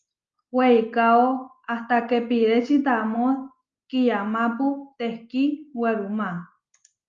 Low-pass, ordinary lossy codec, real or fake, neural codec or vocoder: 7.2 kHz; Opus, 32 kbps; real; none